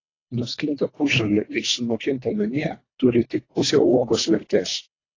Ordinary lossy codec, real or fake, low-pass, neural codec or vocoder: AAC, 32 kbps; fake; 7.2 kHz; codec, 24 kHz, 1.5 kbps, HILCodec